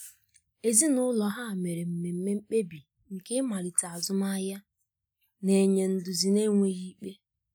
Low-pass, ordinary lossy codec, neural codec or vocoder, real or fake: none; none; none; real